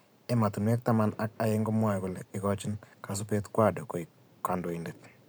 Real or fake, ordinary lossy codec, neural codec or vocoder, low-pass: fake; none; vocoder, 44.1 kHz, 128 mel bands every 512 samples, BigVGAN v2; none